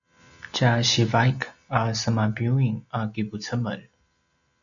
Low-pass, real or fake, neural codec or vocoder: 7.2 kHz; real; none